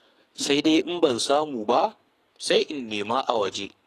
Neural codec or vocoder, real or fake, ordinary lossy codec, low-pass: codec, 44.1 kHz, 2.6 kbps, SNAC; fake; AAC, 48 kbps; 14.4 kHz